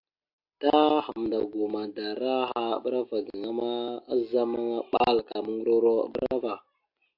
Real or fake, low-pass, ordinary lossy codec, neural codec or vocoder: real; 5.4 kHz; AAC, 48 kbps; none